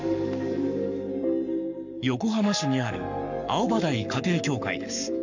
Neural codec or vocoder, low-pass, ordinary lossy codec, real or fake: codec, 44.1 kHz, 7.8 kbps, Pupu-Codec; 7.2 kHz; none; fake